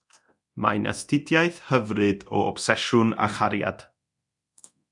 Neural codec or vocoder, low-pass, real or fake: codec, 24 kHz, 0.9 kbps, DualCodec; 10.8 kHz; fake